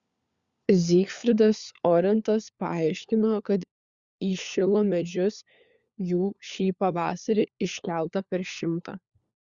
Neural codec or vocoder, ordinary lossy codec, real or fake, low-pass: codec, 16 kHz, 4 kbps, FunCodec, trained on LibriTTS, 50 frames a second; Opus, 64 kbps; fake; 7.2 kHz